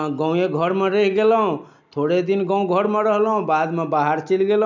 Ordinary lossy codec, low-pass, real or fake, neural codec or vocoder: none; 7.2 kHz; real; none